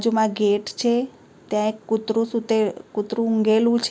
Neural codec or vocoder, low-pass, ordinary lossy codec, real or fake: none; none; none; real